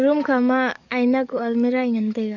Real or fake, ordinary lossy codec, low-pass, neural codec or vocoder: fake; none; 7.2 kHz; codec, 44.1 kHz, 7.8 kbps, DAC